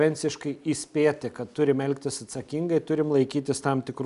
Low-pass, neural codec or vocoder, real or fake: 10.8 kHz; none; real